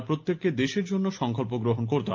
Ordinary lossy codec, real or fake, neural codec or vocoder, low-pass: Opus, 24 kbps; real; none; 7.2 kHz